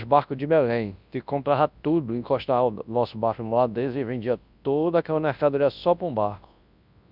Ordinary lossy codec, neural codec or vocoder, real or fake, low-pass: none; codec, 24 kHz, 0.9 kbps, WavTokenizer, large speech release; fake; 5.4 kHz